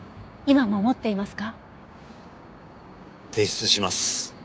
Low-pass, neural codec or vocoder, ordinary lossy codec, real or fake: none; codec, 16 kHz, 6 kbps, DAC; none; fake